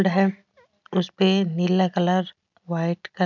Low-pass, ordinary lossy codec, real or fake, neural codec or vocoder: 7.2 kHz; none; real; none